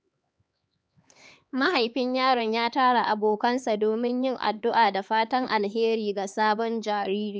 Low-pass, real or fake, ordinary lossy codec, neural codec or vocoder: none; fake; none; codec, 16 kHz, 4 kbps, X-Codec, HuBERT features, trained on LibriSpeech